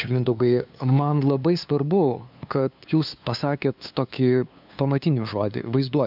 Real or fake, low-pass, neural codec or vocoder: fake; 5.4 kHz; codec, 16 kHz, 2 kbps, FunCodec, trained on LibriTTS, 25 frames a second